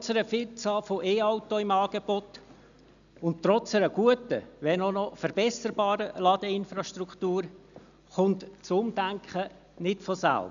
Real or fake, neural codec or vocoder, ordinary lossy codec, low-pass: real; none; none; 7.2 kHz